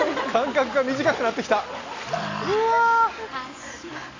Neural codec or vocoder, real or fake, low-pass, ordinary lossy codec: none; real; 7.2 kHz; AAC, 32 kbps